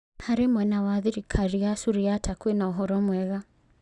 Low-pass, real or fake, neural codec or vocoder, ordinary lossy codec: 10.8 kHz; real; none; Opus, 64 kbps